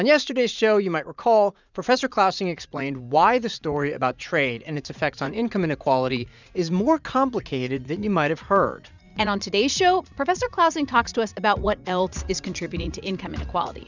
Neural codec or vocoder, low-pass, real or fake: vocoder, 44.1 kHz, 80 mel bands, Vocos; 7.2 kHz; fake